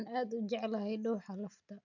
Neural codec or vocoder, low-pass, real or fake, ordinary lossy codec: none; 7.2 kHz; real; none